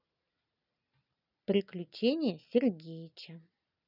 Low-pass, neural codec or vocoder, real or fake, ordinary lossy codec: 5.4 kHz; none; real; none